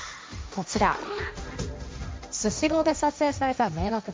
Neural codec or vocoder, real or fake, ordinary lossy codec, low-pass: codec, 16 kHz, 1.1 kbps, Voila-Tokenizer; fake; none; none